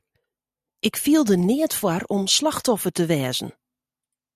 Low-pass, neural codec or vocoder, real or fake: 14.4 kHz; none; real